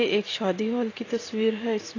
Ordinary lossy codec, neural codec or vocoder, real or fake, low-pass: AAC, 32 kbps; vocoder, 44.1 kHz, 128 mel bands every 512 samples, BigVGAN v2; fake; 7.2 kHz